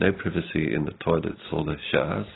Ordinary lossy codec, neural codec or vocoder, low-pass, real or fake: AAC, 16 kbps; none; 7.2 kHz; real